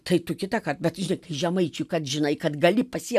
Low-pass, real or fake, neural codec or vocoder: 14.4 kHz; real; none